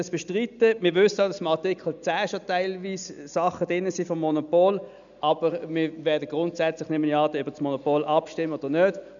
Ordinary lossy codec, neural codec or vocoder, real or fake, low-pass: none; none; real; 7.2 kHz